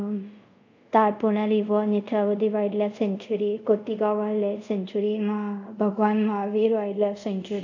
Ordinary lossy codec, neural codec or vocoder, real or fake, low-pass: none; codec, 24 kHz, 0.5 kbps, DualCodec; fake; 7.2 kHz